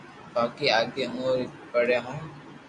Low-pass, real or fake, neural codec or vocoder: 10.8 kHz; real; none